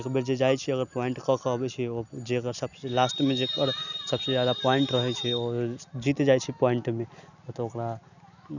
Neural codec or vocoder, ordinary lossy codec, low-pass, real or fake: none; none; 7.2 kHz; real